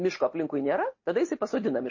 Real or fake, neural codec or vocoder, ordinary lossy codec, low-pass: real; none; MP3, 32 kbps; 7.2 kHz